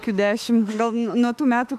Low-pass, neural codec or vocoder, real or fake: 14.4 kHz; autoencoder, 48 kHz, 32 numbers a frame, DAC-VAE, trained on Japanese speech; fake